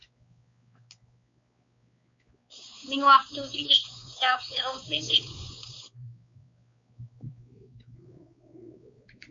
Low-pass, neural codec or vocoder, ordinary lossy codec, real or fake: 7.2 kHz; codec, 16 kHz, 4 kbps, X-Codec, WavLM features, trained on Multilingual LibriSpeech; MP3, 48 kbps; fake